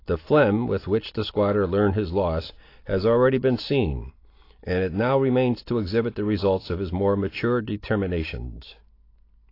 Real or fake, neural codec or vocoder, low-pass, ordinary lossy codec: real; none; 5.4 kHz; AAC, 32 kbps